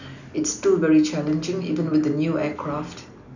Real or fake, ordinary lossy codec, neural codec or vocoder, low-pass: real; none; none; 7.2 kHz